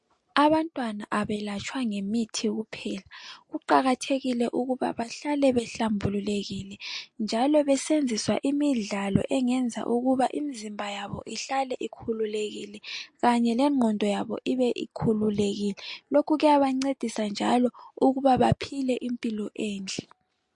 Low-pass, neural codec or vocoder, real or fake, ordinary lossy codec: 10.8 kHz; none; real; MP3, 48 kbps